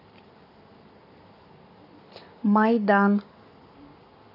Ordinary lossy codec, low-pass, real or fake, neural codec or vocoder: none; 5.4 kHz; fake; codec, 44.1 kHz, 7.8 kbps, Pupu-Codec